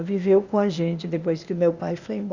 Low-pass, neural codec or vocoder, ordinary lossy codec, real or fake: 7.2 kHz; codec, 16 kHz, 0.8 kbps, ZipCodec; Opus, 64 kbps; fake